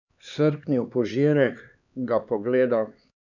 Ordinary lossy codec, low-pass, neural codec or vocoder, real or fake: none; 7.2 kHz; codec, 16 kHz, 4 kbps, X-Codec, HuBERT features, trained on LibriSpeech; fake